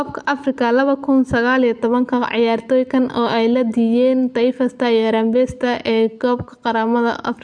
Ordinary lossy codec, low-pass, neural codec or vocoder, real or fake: MP3, 64 kbps; 9.9 kHz; none; real